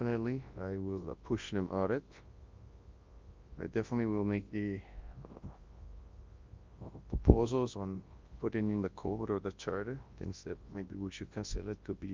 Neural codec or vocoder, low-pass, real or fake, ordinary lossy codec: codec, 24 kHz, 0.9 kbps, WavTokenizer, large speech release; 7.2 kHz; fake; Opus, 24 kbps